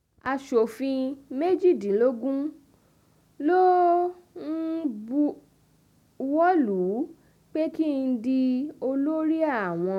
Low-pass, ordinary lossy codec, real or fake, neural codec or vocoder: 19.8 kHz; none; real; none